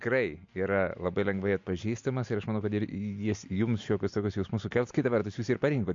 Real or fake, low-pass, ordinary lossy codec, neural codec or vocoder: real; 7.2 kHz; MP3, 48 kbps; none